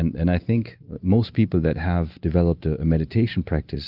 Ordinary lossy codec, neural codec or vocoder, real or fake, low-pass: Opus, 32 kbps; none; real; 5.4 kHz